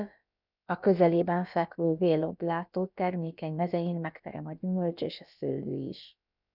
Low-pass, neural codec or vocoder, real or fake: 5.4 kHz; codec, 16 kHz, about 1 kbps, DyCAST, with the encoder's durations; fake